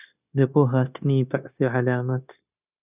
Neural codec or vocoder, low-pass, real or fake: codec, 16 kHz, 0.9 kbps, LongCat-Audio-Codec; 3.6 kHz; fake